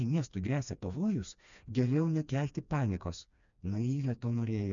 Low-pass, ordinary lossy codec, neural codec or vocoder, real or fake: 7.2 kHz; MP3, 96 kbps; codec, 16 kHz, 2 kbps, FreqCodec, smaller model; fake